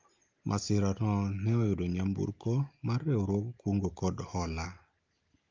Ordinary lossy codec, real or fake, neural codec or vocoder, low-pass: Opus, 24 kbps; real; none; 7.2 kHz